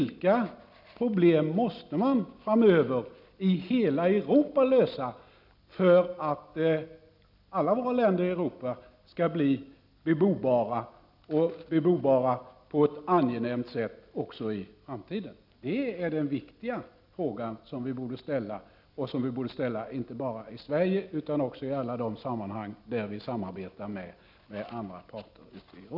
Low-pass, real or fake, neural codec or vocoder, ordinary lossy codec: 5.4 kHz; real; none; none